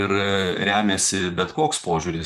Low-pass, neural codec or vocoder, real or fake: 14.4 kHz; vocoder, 44.1 kHz, 128 mel bands, Pupu-Vocoder; fake